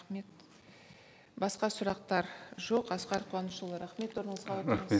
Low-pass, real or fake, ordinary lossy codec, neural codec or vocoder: none; real; none; none